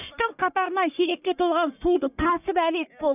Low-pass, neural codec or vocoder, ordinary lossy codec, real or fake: 3.6 kHz; codec, 44.1 kHz, 1.7 kbps, Pupu-Codec; none; fake